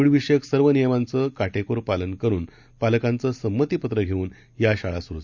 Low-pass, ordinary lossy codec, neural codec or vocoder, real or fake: 7.2 kHz; none; none; real